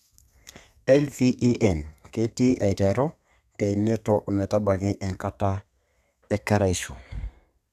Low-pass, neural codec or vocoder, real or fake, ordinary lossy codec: 14.4 kHz; codec, 32 kHz, 1.9 kbps, SNAC; fake; none